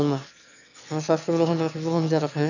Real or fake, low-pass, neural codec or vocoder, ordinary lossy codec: fake; 7.2 kHz; autoencoder, 22.05 kHz, a latent of 192 numbers a frame, VITS, trained on one speaker; none